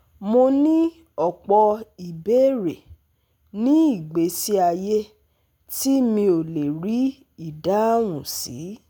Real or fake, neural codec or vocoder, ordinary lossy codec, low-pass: real; none; none; none